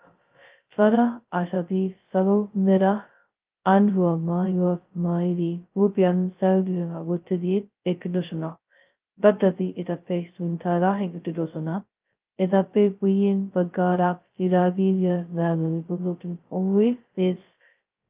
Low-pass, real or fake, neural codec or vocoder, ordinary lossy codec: 3.6 kHz; fake; codec, 16 kHz, 0.2 kbps, FocalCodec; Opus, 32 kbps